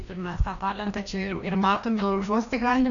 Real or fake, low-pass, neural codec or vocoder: fake; 7.2 kHz; codec, 16 kHz, 1 kbps, FreqCodec, larger model